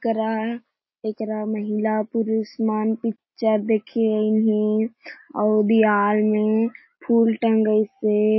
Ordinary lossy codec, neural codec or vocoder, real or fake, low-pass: MP3, 24 kbps; none; real; 7.2 kHz